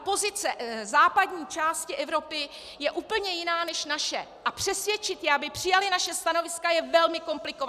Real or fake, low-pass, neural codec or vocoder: real; 14.4 kHz; none